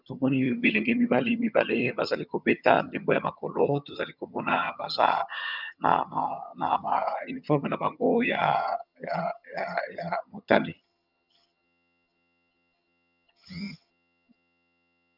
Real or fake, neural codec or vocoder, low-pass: fake; vocoder, 22.05 kHz, 80 mel bands, HiFi-GAN; 5.4 kHz